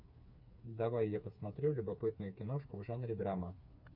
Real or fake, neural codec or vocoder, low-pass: fake; codec, 16 kHz, 4 kbps, FreqCodec, smaller model; 5.4 kHz